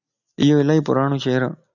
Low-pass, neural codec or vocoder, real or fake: 7.2 kHz; none; real